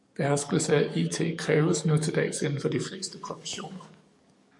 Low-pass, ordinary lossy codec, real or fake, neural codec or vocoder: 10.8 kHz; MP3, 96 kbps; fake; codec, 44.1 kHz, 7.8 kbps, DAC